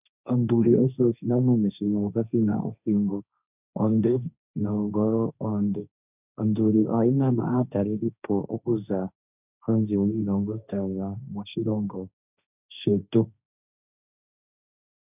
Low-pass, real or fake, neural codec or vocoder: 3.6 kHz; fake; codec, 16 kHz, 1.1 kbps, Voila-Tokenizer